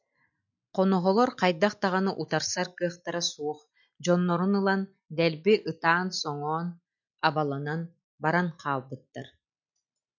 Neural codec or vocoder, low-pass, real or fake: none; 7.2 kHz; real